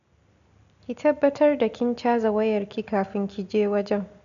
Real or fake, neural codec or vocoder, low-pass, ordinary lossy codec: real; none; 7.2 kHz; none